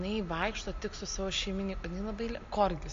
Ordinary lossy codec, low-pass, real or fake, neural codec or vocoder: AAC, 48 kbps; 7.2 kHz; real; none